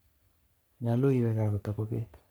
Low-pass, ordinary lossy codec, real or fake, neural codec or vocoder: none; none; fake; codec, 44.1 kHz, 3.4 kbps, Pupu-Codec